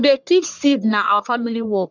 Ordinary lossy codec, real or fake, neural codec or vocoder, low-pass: none; fake; codec, 44.1 kHz, 1.7 kbps, Pupu-Codec; 7.2 kHz